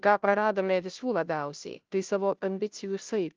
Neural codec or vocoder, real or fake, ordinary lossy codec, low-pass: codec, 16 kHz, 0.5 kbps, FunCodec, trained on Chinese and English, 25 frames a second; fake; Opus, 24 kbps; 7.2 kHz